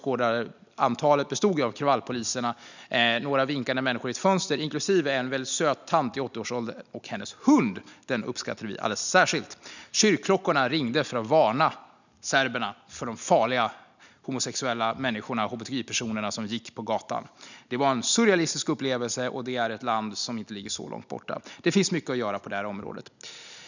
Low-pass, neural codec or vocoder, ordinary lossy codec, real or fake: 7.2 kHz; none; none; real